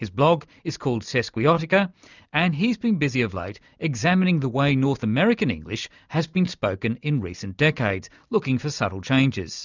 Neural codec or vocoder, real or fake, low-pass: none; real; 7.2 kHz